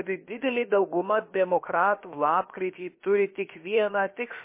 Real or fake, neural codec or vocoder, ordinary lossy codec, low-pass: fake; codec, 16 kHz, about 1 kbps, DyCAST, with the encoder's durations; MP3, 24 kbps; 3.6 kHz